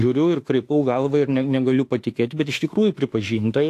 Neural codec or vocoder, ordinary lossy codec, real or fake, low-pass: autoencoder, 48 kHz, 32 numbers a frame, DAC-VAE, trained on Japanese speech; AAC, 64 kbps; fake; 14.4 kHz